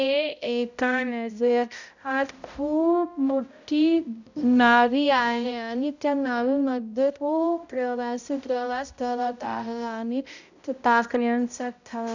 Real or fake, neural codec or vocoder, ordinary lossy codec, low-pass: fake; codec, 16 kHz, 0.5 kbps, X-Codec, HuBERT features, trained on balanced general audio; none; 7.2 kHz